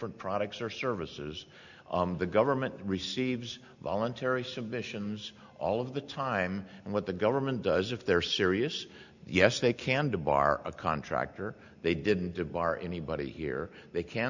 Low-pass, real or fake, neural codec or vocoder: 7.2 kHz; real; none